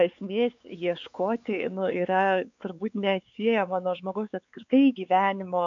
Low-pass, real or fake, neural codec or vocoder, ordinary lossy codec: 7.2 kHz; fake; codec, 16 kHz, 4 kbps, X-Codec, WavLM features, trained on Multilingual LibriSpeech; AAC, 64 kbps